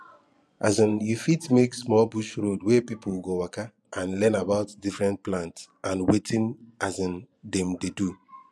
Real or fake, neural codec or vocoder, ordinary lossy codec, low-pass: fake; vocoder, 24 kHz, 100 mel bands, Vocos; none; none